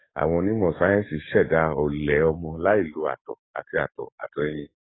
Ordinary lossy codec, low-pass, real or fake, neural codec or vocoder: AAC, 16 kbps; 7.2 kHz; fake; codec, 44.1 kHz, 7.8 kbps, DAC